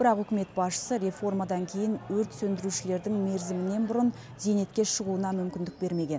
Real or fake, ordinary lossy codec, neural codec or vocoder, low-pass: real; none; none; none